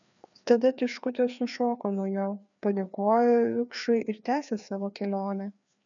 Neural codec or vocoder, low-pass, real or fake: codec, 16 kHz, 2 kbps, FreqCodec, larger model; 7.2 kHz; fake